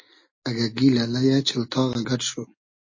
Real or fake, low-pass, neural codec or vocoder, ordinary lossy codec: real; 7.2 kHz; none; MP3, 32 kbps